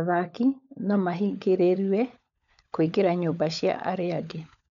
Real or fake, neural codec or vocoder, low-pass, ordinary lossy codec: fake; codec, 16 kHz, 16 kbps, FunCodec, trained on LibriTTS, 50 frames a second; 7.2 kHz; MP3, 96 kbps